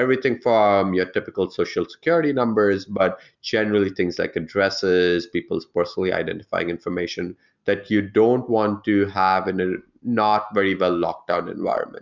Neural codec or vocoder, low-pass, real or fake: none; 7.2 kHz; real